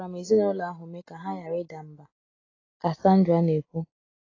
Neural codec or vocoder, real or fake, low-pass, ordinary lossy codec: none; real; 7.2 kHz; AAC, 32 kbps